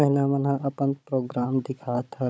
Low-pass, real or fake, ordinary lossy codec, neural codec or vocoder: none; fake; none; codec, 16 kHz, 16 kbps, FunCodec, trained on Chinese and English, 50 frames a second